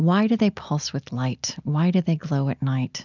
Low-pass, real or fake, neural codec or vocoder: 7.2 kHz; real; none